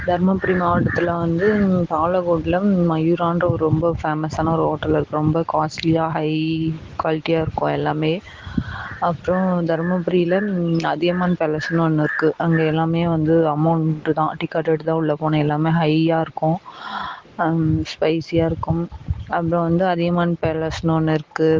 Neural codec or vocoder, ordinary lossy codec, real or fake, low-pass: none; Opus, 16 kbps; real; 7.2 kHz